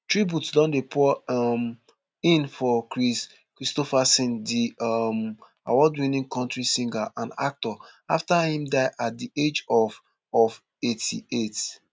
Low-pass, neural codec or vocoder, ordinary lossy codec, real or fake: none; none; none; real